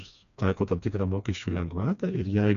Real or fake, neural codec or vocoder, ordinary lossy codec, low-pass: fake; codec, 16 kHz, 2 kbps, FreqCodec, smaller model; MP3, 96 kbps; 7.2 kHz